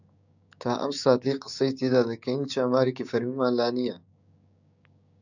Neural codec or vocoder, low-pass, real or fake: codec, 16 kHz, 6 kbps, DAC; 7.2 kHz; fake